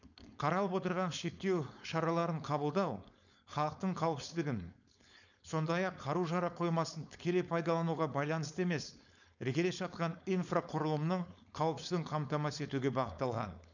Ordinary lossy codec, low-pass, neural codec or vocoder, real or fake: none; 7.2 kHz; codec, 16 kHz, 4.8 kbps, FACodec; fake